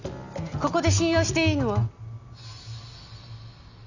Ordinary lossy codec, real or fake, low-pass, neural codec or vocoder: none; real; 7.2 kHz; none